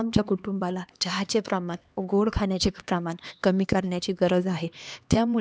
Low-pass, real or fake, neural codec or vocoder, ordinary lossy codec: none; fake; codec, 16 kHz, 2 kbps, X-Codec, HuBERT features, trained on LibriSpeech; none